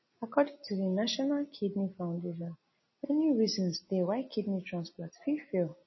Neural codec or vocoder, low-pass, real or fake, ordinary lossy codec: none; 7.2 kHz; real; MP3, 24 kbps